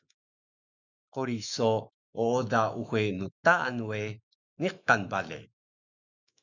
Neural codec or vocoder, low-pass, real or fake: autoencoder, 48 kHz, 128 numbers a frame, DAC-VAE, trained on Japanese speech; 7.2 kHz; fake